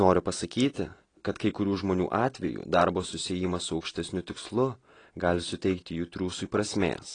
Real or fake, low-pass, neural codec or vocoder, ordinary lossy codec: real; 9.9 kHz; none; AAC, 32 kbps